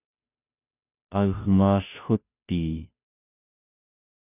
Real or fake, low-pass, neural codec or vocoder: fake; 3.6 kHz; codec, 16 kHz, 0.5 kbps, FunCodec, trained on Chinese and English, 25 frames a second